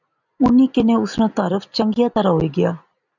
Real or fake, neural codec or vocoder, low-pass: real; none; 7.2 kHz